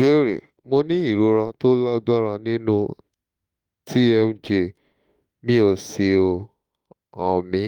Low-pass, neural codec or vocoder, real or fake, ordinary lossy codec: 19.8 kHz; autoencoder, 48 kHz, 32 numbers a frame, DAC-VAE, trained on Japanese speech; fake; Opus, 32 kbps